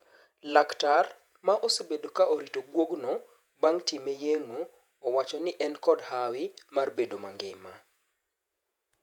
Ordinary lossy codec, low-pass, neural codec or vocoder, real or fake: none; 19.8 kHz; vocoder, 48 kHz, 128 mel bands, Vocos; fake